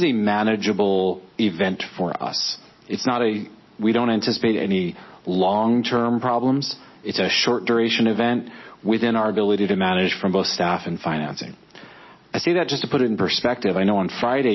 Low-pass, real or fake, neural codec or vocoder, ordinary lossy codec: 7.2 kHz; real; none; MP3, 24 kbps